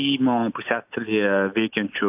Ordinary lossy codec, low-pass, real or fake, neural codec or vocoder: AAC, 32 kbps; 3.6 kHz; real; none